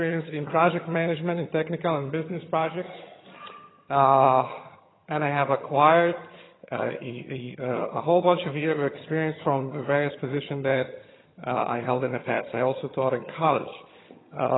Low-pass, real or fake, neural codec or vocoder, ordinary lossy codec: 7.2 kHz; fake; vocoder, 22.05 kHz, 80 mel bands, HiFi-GAN; AAC, 16 kbps